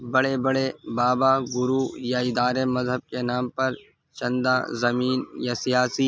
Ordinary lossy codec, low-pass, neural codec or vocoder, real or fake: none; none; none; real